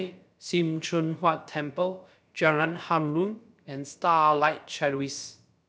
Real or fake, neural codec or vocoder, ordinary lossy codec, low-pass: fake; codec, 16 kHz, about 1 kbps, DyCAST, with the encoder's durations; none; none